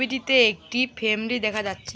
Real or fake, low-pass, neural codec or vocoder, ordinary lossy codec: real; none; none; none